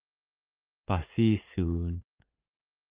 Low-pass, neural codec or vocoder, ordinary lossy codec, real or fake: 3.6 kHz; vocoder, 44.1 kHz, 80 mel bands, Vocos; Opus, 24 kbps; fake